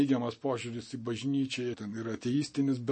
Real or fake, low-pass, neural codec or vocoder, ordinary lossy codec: real; 10.8 kHz; none; MP3, 32 kbps